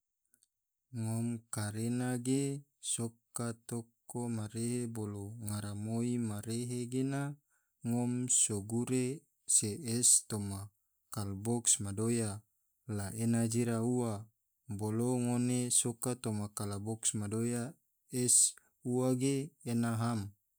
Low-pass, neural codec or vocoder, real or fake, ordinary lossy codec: none; none; real; none